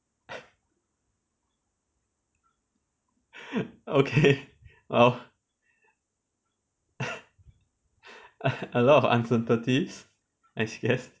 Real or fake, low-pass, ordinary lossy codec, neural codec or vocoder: real; none; none; none